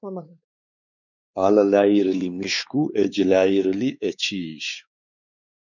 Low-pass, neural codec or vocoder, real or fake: 7.2 kHz; codec, 16 kHz, 4 kbps, X-Codec, WavLM features, trained on Multilingual LibriSpeech; fake